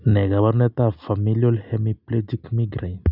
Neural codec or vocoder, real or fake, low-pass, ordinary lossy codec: none; real; 5.4 kHz; none